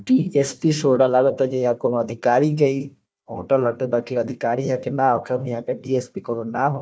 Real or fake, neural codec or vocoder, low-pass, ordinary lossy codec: fake; codec, 16 kHz, 1 kbps, FunCodec, trained on Chinese and English, 50 frames a second; none; none